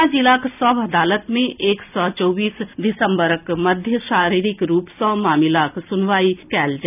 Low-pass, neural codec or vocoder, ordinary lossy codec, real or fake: 3.6 kHz; none; none; real